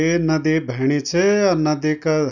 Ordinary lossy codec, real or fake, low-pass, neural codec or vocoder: none; real; 7.2 kHz; none